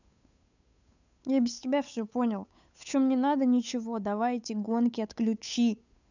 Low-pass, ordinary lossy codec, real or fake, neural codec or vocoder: 7.2 kHz; none; fake; codec, 16 kHz, 8 kbps, FunCodec, trained on Chinese and English, 25 frames a second